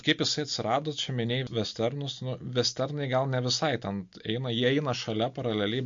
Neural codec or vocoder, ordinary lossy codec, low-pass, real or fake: none; MP3, 48 kbps; 7.2 kHz; real